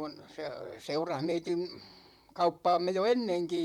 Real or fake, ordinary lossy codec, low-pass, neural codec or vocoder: fake; none; 19.8 kHz; vocoder, 44.1 kHz, 128 mel bands, Pupu-Vocoder